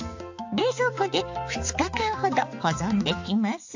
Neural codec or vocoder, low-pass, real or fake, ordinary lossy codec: codec, 16 kHz, 4 kbps, X-Codec, HuBERT features, trained on balanced general audio; 7.2 kHz; fake; AAC, 48 kbps